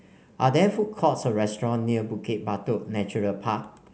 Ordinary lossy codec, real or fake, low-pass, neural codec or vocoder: none; real; none; none